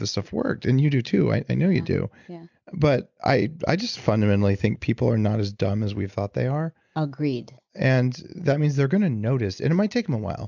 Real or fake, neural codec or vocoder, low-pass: real; none; 7.2 kHz